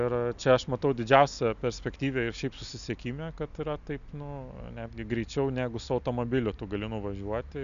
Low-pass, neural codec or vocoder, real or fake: 7.2 kHz; none; real